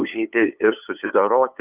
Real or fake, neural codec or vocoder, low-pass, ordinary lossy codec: fake; codec, 16 kHz, 8 kbps, FunCodec, trained on LibriTTS, 25 frames a second; 3.6 kHz; Opus, 24 kbps